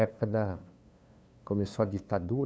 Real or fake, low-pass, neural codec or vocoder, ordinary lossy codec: fake; none; codec, 16 kHz, 2 kbps, FunCodec, trained on LibriTTS, 25 frames a second; none